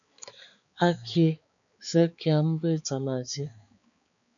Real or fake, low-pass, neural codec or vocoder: fake; 7.2 kHz; codec, 16 kHz, 4 kbps, X-Codec, HuBERT features, trained on balanced general audio